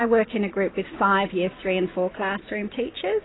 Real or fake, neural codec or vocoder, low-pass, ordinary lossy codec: real; none; 7.2 kHz; AAC, 16 kbps